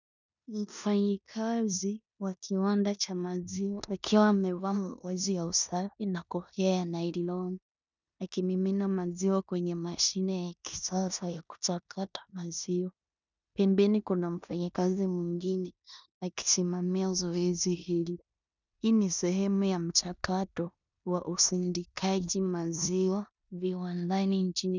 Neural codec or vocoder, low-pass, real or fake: codec, 16 kHz in and 24 kHz out, 0.9 kbps, LongCat-Audio-Codec, four codebook decoder; 7.2 kHz; fake